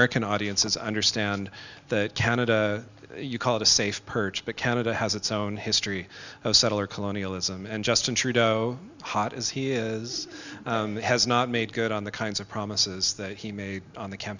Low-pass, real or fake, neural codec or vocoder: 7.2 kHz; real; none